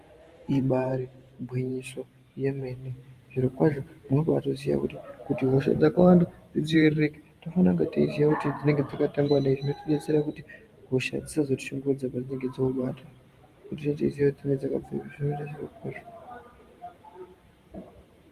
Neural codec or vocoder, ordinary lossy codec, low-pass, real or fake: vocoder, 44.1 kHz, 128 mel bands every 512 samples, BigVGAN v2; Opus, 32 kbps; 14.4 kHz; fake